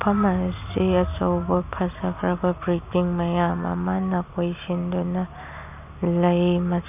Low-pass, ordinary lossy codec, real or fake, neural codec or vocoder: 3.6 kHz; none; real; none